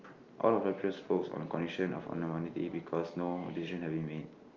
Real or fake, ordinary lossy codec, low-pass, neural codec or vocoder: real; Opus, 24 kbps; 7.2 kHz; none